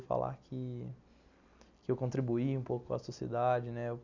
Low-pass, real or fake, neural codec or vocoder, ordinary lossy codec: 7.2 kHz; real; none; none